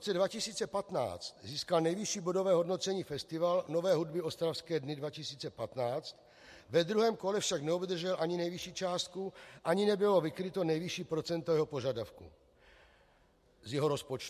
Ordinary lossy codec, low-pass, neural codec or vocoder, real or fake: MP3, 64 kbps; 14.4 kHz; none; real